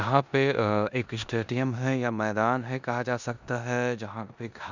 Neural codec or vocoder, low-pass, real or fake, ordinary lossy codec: codec, 16 kHz in and 24 kHz out, 0.9 kbps, LongCat-Audio-Codec, four codebook decoder; 7.2 kHz; fake; none